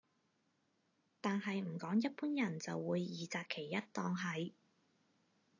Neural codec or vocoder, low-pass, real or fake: none; 7.2 kHz; real